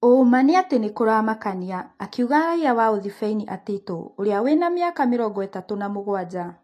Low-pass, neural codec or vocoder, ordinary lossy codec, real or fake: 14.4 kHz; none; AAC, 48 kbps; real